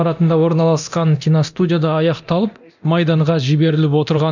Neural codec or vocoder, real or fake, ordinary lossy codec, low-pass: codec, 24 kHz, 0.9 kbps, DualCodec; fake; none; 7.2 kHz